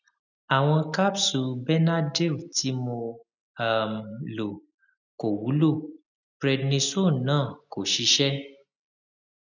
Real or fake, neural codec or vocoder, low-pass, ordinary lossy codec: real; none; 7.2 kHz; none